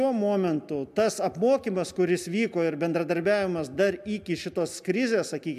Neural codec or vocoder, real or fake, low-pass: none; real; 14.4 kHz